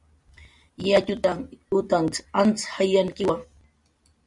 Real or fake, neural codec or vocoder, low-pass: real; none; 10.8 kHz